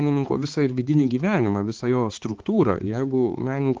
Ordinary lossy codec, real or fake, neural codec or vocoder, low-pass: Opus, 16 kbps; fake; codec, 16 kHz, 4 kbps, X-Codec, HuBERT features, trained on balanced general audio; 7.2 kHz